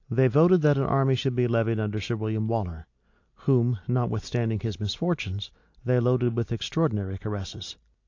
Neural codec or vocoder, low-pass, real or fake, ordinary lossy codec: none; 7.2 kHz; real; AAC, 48 kbps